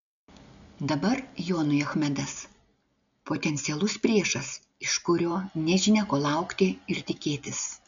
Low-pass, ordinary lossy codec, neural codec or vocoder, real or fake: 7.2 kHz; MP3, 96 kbps; none; real